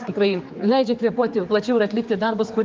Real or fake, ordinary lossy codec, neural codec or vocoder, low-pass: fake; Opus, 32 kbps; codec, 16 kHz, 4 kbps, X-Codec, HuBERT features, trained on general audio; 7.2 kHz